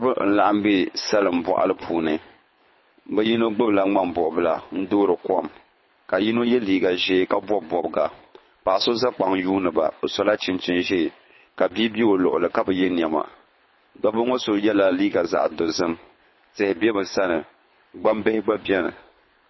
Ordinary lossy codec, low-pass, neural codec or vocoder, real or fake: MP3, 24 kbps; 7.2 kHz; codec, 24 kHz, 6 kbps, HILCodec; fake